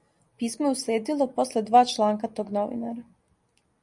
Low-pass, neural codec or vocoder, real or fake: 10.8 kHz; none; real